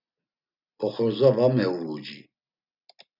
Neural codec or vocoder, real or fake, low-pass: none; real; 5.4 kHz